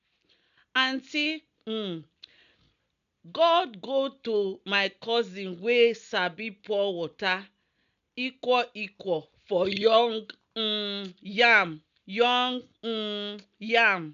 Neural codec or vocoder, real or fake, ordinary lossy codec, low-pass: none; real; none; 7.2 kHz